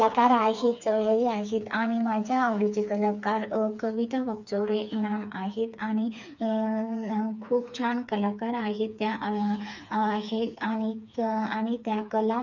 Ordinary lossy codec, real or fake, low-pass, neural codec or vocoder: none; fake; 7.2 kHz; codec, 16 kHz, 4 kbps, FreqCodec, smaller model